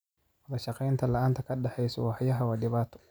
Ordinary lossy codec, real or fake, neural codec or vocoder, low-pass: none; real; none; none